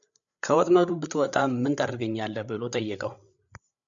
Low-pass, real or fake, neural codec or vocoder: 7.2 kHz; fake; codec, 16 kHz, 8 kbps, FreqCodec, larger model